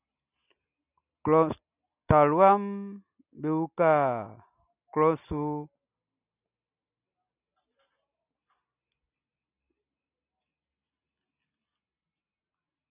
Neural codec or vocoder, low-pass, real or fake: none; 3.6 kHz; real